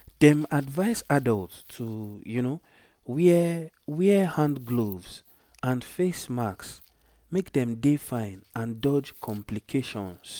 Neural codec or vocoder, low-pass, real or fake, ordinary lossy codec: none; none; real; none